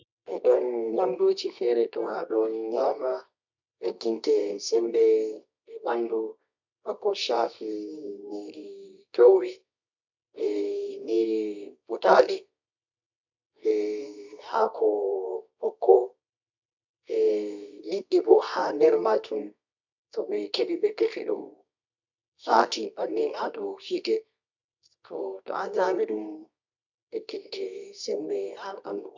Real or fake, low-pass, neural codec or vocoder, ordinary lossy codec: fake; 7.2 kHz; codec, 24 kHz, 0.9 kbps, WavTokenizer, medium music audio release; MP3, 64 kbps